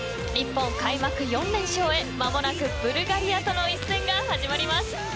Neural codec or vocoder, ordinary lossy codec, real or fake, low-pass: none; none; real; none